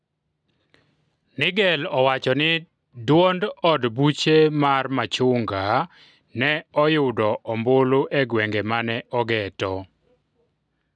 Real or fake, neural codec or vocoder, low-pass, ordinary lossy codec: real; none; none; none